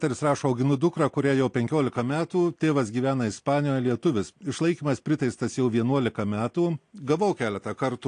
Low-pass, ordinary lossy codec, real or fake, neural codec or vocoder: 9.9 kHz; AAC, 48 kbps; real; none